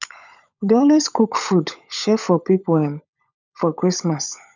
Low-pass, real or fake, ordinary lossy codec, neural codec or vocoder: 7.2 kHz; fake; none; codec, 16 kHz, 16 kbps, FunCodec, trained on LibriTTS, 50 frames a second